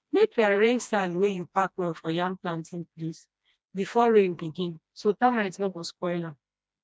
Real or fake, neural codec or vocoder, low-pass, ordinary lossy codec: fake; codec, 16 kHz, 1 kbps, FreqCodec, smaller model; none; none